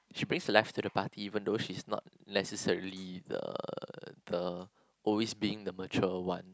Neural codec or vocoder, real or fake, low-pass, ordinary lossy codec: none; real; none; none